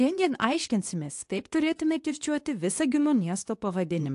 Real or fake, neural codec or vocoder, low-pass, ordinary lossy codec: fake; codec, 24 kHz, 0.9 kbps, WavTokenizer, medium speech release version 2; 10.8 kHz; AAC, 64 kbps